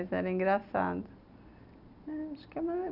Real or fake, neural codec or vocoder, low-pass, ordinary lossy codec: real; none; 5.4 kHz; none